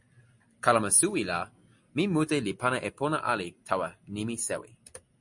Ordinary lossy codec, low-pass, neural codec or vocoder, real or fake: MP3, 48 kbps; 10.8 kHz; none; real